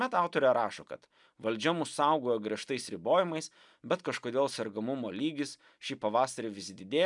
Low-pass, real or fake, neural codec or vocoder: 10.8 kHz; real; none